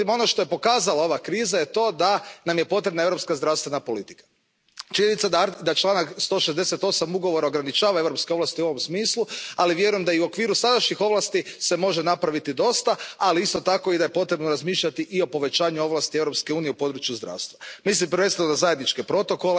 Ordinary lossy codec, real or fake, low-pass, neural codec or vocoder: none; real; none; none